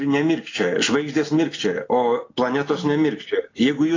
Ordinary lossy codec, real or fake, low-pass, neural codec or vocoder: AAC, 32 kbps; real; 7.2 kHz; none